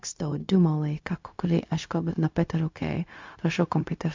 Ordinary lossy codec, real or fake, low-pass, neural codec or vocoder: MP3, 64 kbps; fake; 7.2 kHz; codec, 16 kHz, 0.4 kbps, LongCat-Audio-Codec